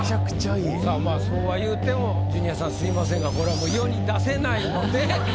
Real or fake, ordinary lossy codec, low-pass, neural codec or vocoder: real; none; none; none